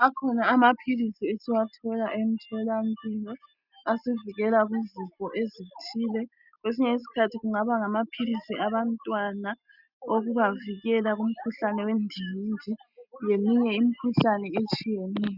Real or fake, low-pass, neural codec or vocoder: real; 5.4 kHz; none